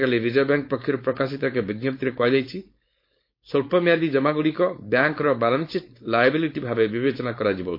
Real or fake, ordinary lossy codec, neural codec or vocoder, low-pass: fake; MP3, 32 kbps; codec, 16 kHz, 4.8 kbps, FACodec; 5.4 kHz